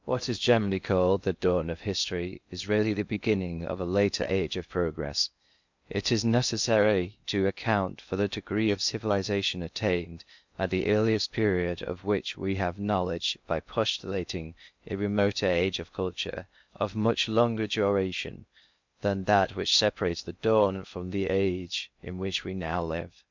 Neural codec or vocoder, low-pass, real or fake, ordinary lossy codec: codec, 16 kHz in and 24 kHz out, 0.6 kbps, FocalCodec, streaming, 2048 codes; 7.2 kHz; fake; MP3, 64 kbps